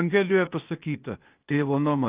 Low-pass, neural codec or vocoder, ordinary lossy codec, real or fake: 3.6 kHz; codec, 16 kHz, 0.8 kbps, ZipCodec; Opus, 32 kbps; fake